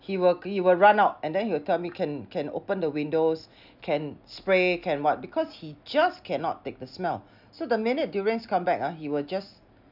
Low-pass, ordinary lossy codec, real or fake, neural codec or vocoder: 5.4 kHz; none; real; none